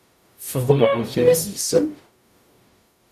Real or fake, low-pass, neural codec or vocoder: fake; 14.4 kHz; codec, 44.1 kHz, 0.9 kbps, DAC